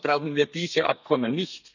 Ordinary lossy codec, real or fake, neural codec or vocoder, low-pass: none; fake; codec, 32 kHz, 1.9 kbps, SNAC; 7.2 kHz